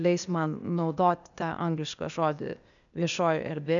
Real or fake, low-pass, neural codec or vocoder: fake; 7.2 kHz; codec, 16 kHz, 0.8 kbps, ZipCodec